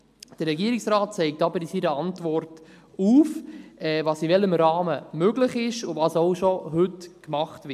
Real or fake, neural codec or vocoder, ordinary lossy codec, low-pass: real; none; MP3, 96 kbps; 14.4 kHz